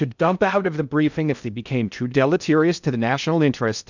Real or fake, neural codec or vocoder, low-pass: fake; codec, 16 kHz in and 24 kHz out, 0.6 kbps, FocalCodec, streaming, 2048 codes; 7.2 kHz